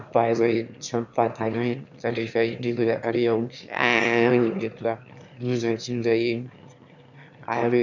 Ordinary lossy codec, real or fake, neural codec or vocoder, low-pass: none; fake; autoencoder, 22.05 kHz, a latent of 192 numbers a frame, VITS, trained on one speaker; 7.2 kHz